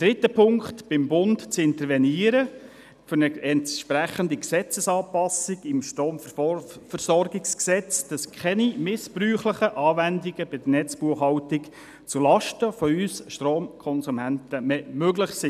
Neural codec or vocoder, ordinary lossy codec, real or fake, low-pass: none; none; real; 14.4 kHz